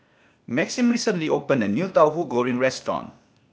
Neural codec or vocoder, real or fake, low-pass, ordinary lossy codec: codec, 16 kHz, 0.8 kbps, ZipCodec; fake; none; none